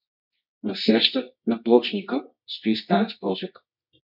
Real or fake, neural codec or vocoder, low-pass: fake; codec, 24 kHz, 0.9 kbps, WavTokenizer, medium music audio release; 5.4 kHz